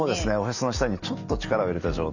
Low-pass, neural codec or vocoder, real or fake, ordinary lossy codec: 7.2 kHz; none; real; none